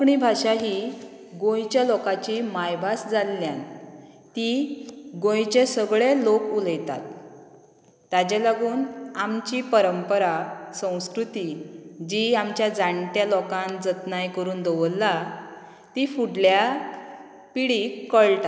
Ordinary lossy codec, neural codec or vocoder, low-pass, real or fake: none; none; none; real